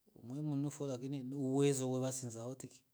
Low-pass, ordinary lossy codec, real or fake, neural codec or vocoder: none; none; fake; autoencoder, 48 kHz, 128 numbers a frame, DAC-VAE, trained on Japanese speech